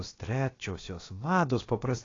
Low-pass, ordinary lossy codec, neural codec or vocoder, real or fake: 7.2 kHz; AAC, 32 kbps; codec, 16 kHz, about 1 kbps, DyCAST, with the encoder's durations; fake